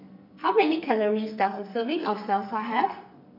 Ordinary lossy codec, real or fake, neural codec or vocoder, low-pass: none; fake; codec, 44.1 kHz, 2.6 kbps, SNAC; 5.4 kHz